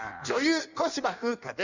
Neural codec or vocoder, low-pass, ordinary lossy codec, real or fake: codec, 16 kHz in and 24 kHz out, 1.1 kbps, FireRedTTS-2 codec; 7.2 kHz; none; fake